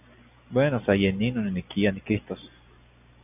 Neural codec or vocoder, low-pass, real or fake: none; 3.6 kHz; real